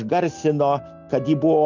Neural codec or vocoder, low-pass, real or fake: none; 7.2 kHz; real